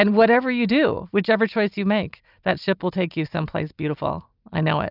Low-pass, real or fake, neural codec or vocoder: 5.4 kHz; real; none